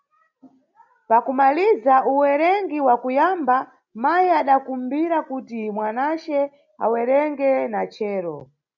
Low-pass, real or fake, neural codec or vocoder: 7.2 kHz; real; none